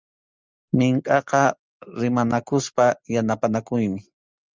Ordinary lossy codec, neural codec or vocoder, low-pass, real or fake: Opus, 32 kbps; none; 7.2 kHz; real